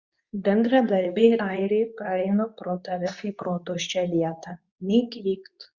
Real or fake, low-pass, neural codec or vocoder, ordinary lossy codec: fake; 7.2 kHz; codec, 24 kHz, 0.9 kbps, WavTokenizer, medium speech release version 2; Opus, 64 kbps